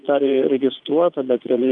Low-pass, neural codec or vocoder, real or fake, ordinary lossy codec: 9.9 kHz; vocoder, 22.05 kHz, 80 mel bands, Vocos; fake; Opus, 32 kbps